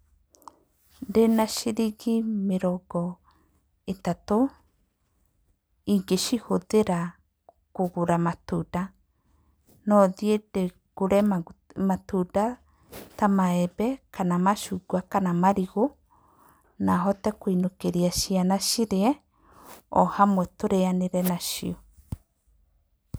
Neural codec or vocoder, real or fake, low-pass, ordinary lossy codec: none; real; none; none